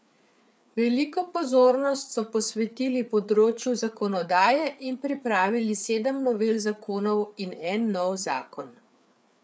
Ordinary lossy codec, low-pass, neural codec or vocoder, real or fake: none; none; codec, 16 kHz, 4 kbps, FreqCodec, larger model; fake